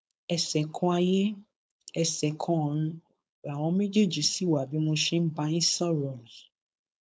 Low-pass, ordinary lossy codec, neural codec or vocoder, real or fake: none; none; codec, 16 kHz, 4.8 kbps, FACodec; fake